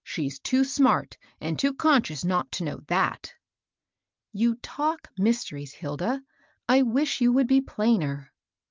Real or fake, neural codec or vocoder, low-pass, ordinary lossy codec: real; none; 7.2 kHz; Opus, 24 kbps